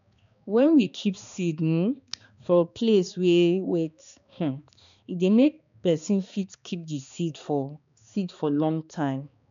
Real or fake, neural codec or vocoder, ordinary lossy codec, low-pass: fake; codec, 16 kHz, 2 kbps, X-Codec, HuBERT features, trained on balanced general audio; none; 7.2 kHz